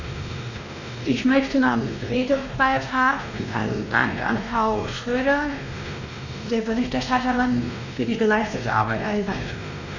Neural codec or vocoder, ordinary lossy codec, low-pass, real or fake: codec, 16 kHz, 1 kbps, X-Codec, WavLM features, trained on Multilingual LibriSpeech; none; 7.2 kHz; fake